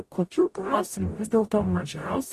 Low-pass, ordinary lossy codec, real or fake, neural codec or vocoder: 14.4 kHz; AAC, 48 kbps; fake; codec, 44.1 kHz, 0.9 kbps, DAC